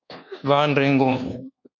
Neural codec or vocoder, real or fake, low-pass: codec, 24 kHz, 1.2 kbps, DualCodec; fake; 7.2 kHz